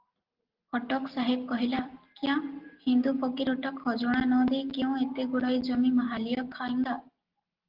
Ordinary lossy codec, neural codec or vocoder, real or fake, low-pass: Opus, 16 kbps; none; real; 5.4 kHz